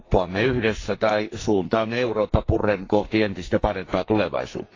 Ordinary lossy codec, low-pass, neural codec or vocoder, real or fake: AAC, 32 kbps; 7.2 kHz; codec, 44.1 kHz, 2.6 kbps, SNAC; fake